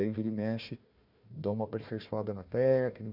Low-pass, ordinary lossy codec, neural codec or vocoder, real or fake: 5.4 kHz; none; codec, 16 kHz, 1 kbps, FunCodec, trained on Chinese and English, 50 frames a second; fake